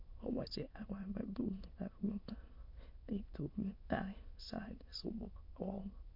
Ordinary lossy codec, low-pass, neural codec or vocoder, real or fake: MP3, 32 kbps; 5.4 kHz; autoencoder, 22.05 kHz, a latent of 192 numbers a frame, VITS, trained on many speakers; fake